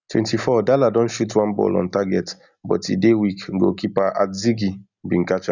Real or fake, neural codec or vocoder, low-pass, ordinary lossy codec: real; none; 7.2 kHz; none